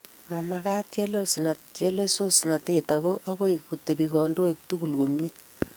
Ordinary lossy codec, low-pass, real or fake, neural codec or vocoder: none; none; fake; codec, 44.1 kHz, 2.6 kbps, SNAC